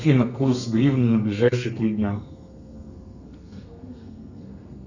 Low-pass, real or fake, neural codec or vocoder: 7.2 kHz; fake; codec, 32 kHz, 1.9 kbps, SNAC